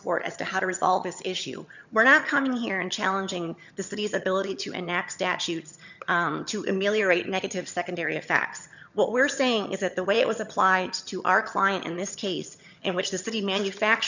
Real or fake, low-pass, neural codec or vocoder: fake; 7.2 kHz; vocoder, 22.05 kHz, 80 mel bands, HiFi-GAN